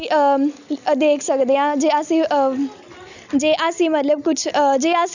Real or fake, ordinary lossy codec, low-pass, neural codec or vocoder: real; none; 7.2 kHz; none